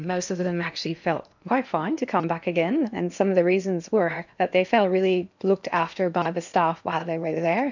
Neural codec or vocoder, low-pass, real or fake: codec, 16 kHz in and 24 kHz out, 0.8 kbps, FocalCodec, streaming, 65536 codes; 7.2 kHz; fake